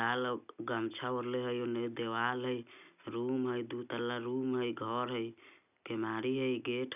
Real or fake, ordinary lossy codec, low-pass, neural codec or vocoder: real; none; 3.6 kHz; none